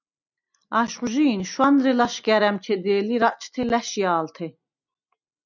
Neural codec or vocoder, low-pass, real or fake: none; 7.2 kHz; real